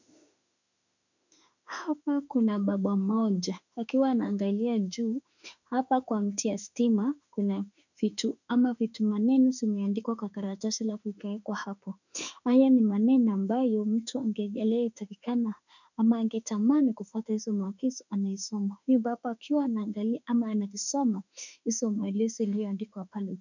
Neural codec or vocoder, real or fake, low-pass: autoencoder, 48 kHz, 32 numbers a frame, DAC-VAE, trained on Japanese speech; fake; 7.2 kHz